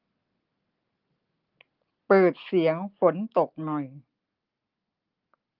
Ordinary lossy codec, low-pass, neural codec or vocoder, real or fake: Opus, 32 kbps; 5.4 kHz; none; real